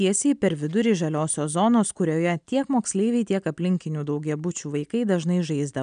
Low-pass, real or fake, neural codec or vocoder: 9.9 kHz; real; none